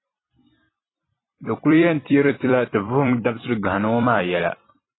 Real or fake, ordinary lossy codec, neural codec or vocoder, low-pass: fake; AAC, 16 kbps; vocoder, 44.1 kHz, 128 mel bands every 256 samples, BigVGAN v2; 7.2 kHz